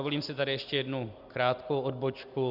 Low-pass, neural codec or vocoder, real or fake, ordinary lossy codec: 5.4 kHz; none; real; Opus, 64 kbps